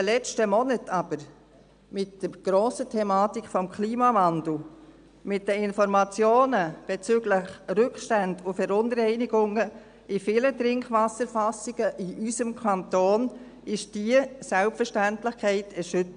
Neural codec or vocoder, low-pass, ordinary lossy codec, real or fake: none; 9.9 kHz; AAC, 96 kbps; real